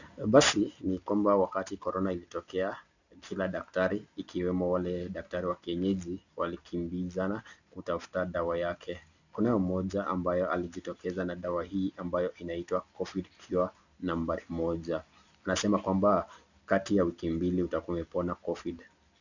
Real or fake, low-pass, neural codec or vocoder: real; 7.2 kHz; none